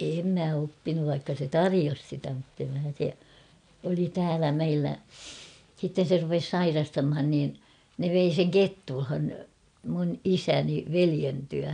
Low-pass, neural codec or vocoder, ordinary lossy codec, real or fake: 9.9 kHz; none; none; real